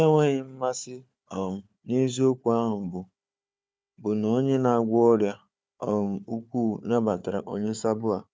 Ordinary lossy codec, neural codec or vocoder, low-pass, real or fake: none; codec, 16 kHz, 4 kbps, FunCodec, trained on Chinese and English, 50 frames a second; none; fake